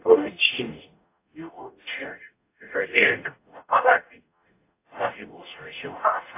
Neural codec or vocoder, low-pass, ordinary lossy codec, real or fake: codec, 44.1 kHz, 0.9 kbps, DAC; 3.6 kHz; AAC, 24 kbps; fake